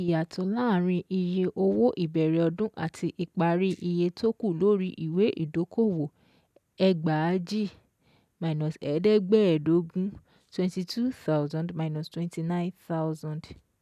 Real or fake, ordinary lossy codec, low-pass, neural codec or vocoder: real; none; 14.4 kHz; none